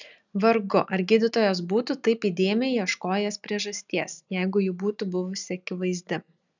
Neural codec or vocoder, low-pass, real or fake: none; 7.2 kHz; real